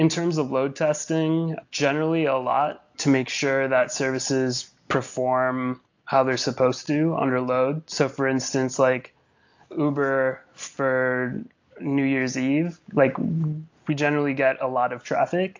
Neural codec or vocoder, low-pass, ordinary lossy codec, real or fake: none; 7.2 kHz; AAC, 48 kbps; real